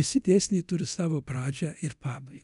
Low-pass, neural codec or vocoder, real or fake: 10.8 kHz; codec, 24 kHz, 0.9 kbps, DualCodec; fake